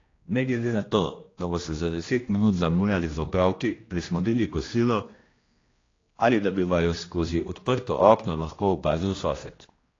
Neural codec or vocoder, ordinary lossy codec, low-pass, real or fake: codec, 16 kHz, 1 kbps, X-Codec, HuBERT features, trained on general audio; AAC, 32 kbps; 7.2 kHz; fake